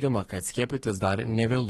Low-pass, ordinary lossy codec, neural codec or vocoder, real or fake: 14.4 kHz; AAC, 32 kbps; codec, 32 kHz, 1.9 kbps, SNAC; fake